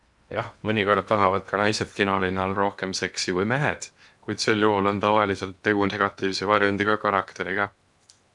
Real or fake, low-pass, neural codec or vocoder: fake; 10.8 kHz; codec, 16 kHz in and 24 kHz out, 0.8 kbps, FocalCodec, streaming, 65536 codes